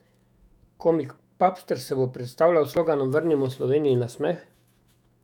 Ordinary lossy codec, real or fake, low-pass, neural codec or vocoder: none; fake; 19.8 kHz; autoencoder, 48 kHz, 128 numbers a frame, DAC-VAE, trained on Japanese speech